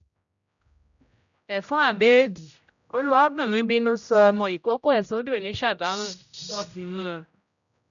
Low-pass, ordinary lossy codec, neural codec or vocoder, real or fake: 7.2 kHz; none; codec, 16 kHz, 0.5 kbps, X-Codec, HuBERT features, trained on general audio; fake